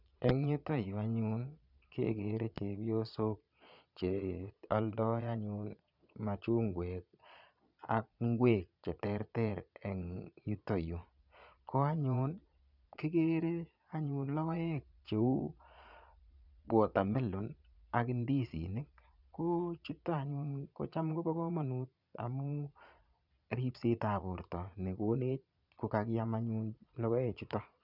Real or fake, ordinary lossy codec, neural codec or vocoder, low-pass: fake; none; vocoder, 22.05 kHz, 80 mel bands, Vocos; 5.4 kHz